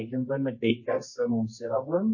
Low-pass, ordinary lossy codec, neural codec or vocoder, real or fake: 7.2 kHz; MP3, 32 kbps; codec, 24 kHz, 0.9 kbps, WavTokenizer, medium music audio release; fake